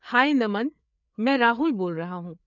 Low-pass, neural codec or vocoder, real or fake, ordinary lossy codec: 7.2 kHz; codec, 16 kHz, 4 kbps, FreqCodec, larger model; fake; none